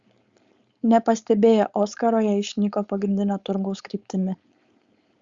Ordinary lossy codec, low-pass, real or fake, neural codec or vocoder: Opus, 64 kbps; 7.2 kHz; fake; codec, 16 kHz, 4.8 kbps, FACodec